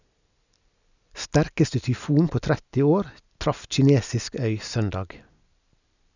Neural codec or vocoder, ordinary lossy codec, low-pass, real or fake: none; none; 7.2 kHz; real